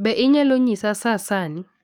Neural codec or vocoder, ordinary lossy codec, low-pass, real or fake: codec, 44.1 kHz, 7.8 kbps, DAC; none; none; fake